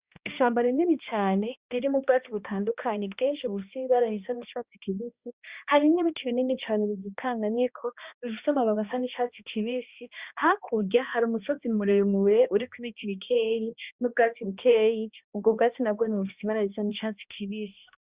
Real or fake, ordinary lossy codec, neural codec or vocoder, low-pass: fake; Opus, 64 kbps; codec, 16 kHz, 1 kbps, X-Codec, HuBERT features, trained on balanced general audio; 3.6 kHz